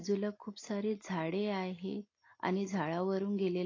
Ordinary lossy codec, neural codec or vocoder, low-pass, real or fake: AAC, 32 kbps; none; 7.2 kHz; real